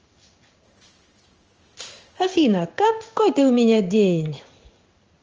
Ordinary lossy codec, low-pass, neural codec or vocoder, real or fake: Opus, 24 kbps; 7.2 kHz; codec, 16 kHz in and 24 kHz out, 1 kbps, XY-Tokenizer; fake